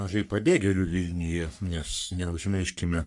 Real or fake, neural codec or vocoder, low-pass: fake; codec, 44.1 kHz, 3.4 kbps, Pupu-Codec; 10.8 kHz